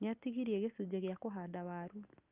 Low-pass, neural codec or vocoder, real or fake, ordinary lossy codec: 3.6 kHz; none; real; Opus, 24 kbps